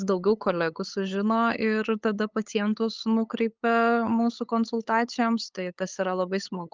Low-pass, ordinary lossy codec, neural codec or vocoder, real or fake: 7.2 kHz; Opus, 24 kbps; codec, 16 kHz, 8 kbps, FunCodec, trained on LibriTTS, 25 frames a second; fake